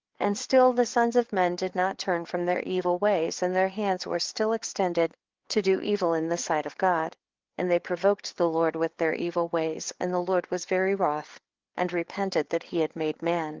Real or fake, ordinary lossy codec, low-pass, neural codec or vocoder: fake; Opus, 16 kbps; 7.2 kHz; codec, 16 kHz, 4 kbps, FreqCodec, larger model